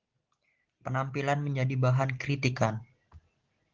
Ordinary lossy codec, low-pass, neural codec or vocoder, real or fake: Opus, 16 kbps; 7.2 kHz; none; real